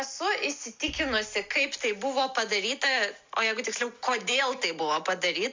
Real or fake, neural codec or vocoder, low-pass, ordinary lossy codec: real; none; 7.2 kHz; MP3, 64 kbps